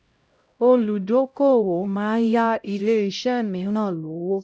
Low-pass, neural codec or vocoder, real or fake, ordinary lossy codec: none; codec, 16 kHz, 0.5 kbps, X-Codec, HuBERT features, trained on LibriSpeech; fake; none